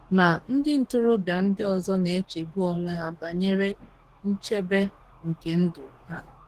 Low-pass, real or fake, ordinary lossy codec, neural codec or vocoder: 14.4 kHz; fake; Opus, 16 kbps; codec, 44.1 kHz, 2.6 kbps, DAC